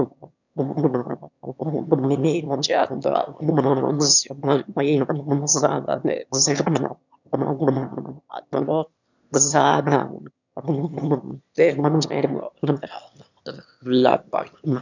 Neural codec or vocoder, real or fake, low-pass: autoencoder, 22.05 kHz, a latent of 192 numbers a frame, VITS, trained on one speaker; fake; 7.2 kHz